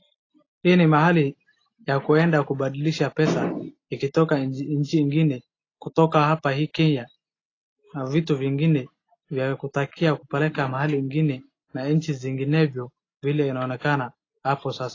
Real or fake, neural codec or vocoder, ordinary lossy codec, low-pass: real; none; AAC, 32 kbps; 7.2 kHz